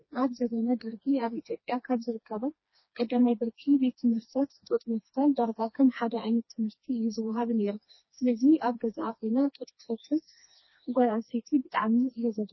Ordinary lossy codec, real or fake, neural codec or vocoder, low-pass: MP3, 24 kbps; fake; codec, 16 kHz, 2 kbps, FreqCodec, smaller model; 7.2 kHz